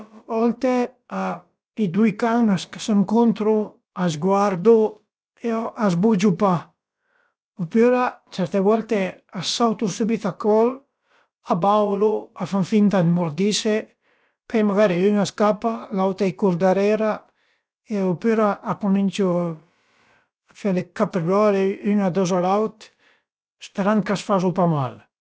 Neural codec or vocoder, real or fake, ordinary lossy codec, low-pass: codec, 16 kHz, about 1 kbps, DyCAST, with the encoder's durations; fake; none; none